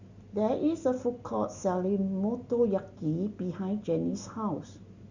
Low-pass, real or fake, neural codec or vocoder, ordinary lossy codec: 7.2 kHz; real; none; Opus, 64 kbps